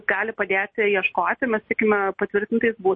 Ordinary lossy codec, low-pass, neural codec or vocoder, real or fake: MP3, 32 kbps; 9.9 kHz; none; real